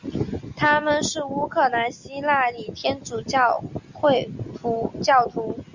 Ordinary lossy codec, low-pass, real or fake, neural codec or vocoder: Opus, 64 kbps; 7.2 kHz; real; none